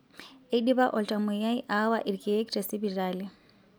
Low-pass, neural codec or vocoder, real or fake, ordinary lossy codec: none; none; real; none